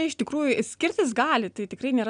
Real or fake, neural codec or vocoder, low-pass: real; none; 9.9 kHz